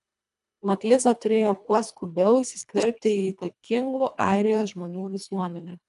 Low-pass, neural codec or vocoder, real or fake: 10.8 kHz; codec, 24 kHz, 1.5 kbps, HILCodec; fake